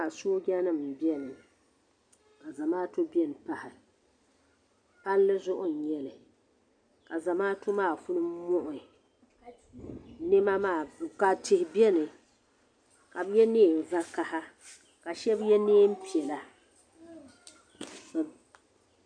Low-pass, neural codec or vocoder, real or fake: 9.9 kHz; none; real